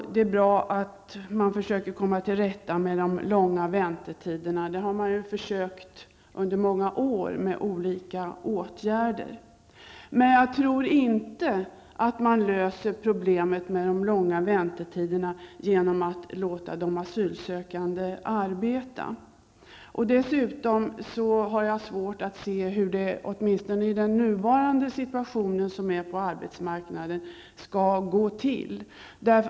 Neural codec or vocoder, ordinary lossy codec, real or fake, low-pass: none; none; real; none